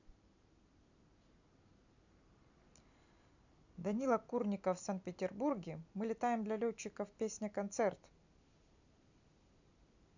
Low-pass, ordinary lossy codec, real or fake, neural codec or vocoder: 7.2 kHz; none; real; none